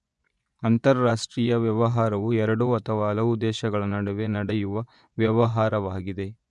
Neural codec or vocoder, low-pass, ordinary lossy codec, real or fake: vocoder, 24 kHz, 100 mel bands, Vocos; 10.8 kHz; none; fake